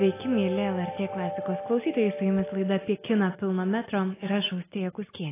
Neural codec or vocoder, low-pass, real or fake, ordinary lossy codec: none; 3.6 kHz; real; AAC, 16 kbps